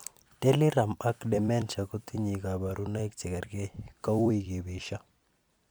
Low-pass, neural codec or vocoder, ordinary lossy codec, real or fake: none; vocoder, 44.1 kHz, 128 mel bands every 256 samples, BigVGAN v2; none; fake